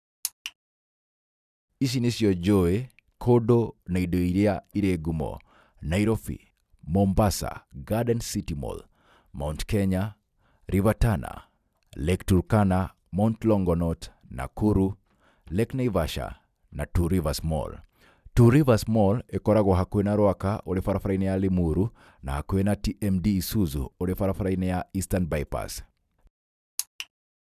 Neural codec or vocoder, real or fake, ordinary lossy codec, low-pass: none; real; none; 14.4 kHz